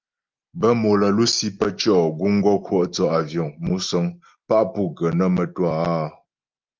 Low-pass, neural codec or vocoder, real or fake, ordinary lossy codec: 7.2 kHz; none; real; Opus, 24 kbps